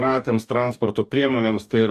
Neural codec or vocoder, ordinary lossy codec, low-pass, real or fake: codec, 44.1 kHz, 2.6 kbps, DAC; Opus, 64 kbps; 14.4 kHz; fake